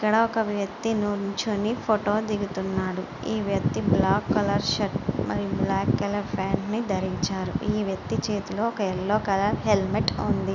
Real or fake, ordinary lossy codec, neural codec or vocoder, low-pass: real; none; none; 7.2 kHz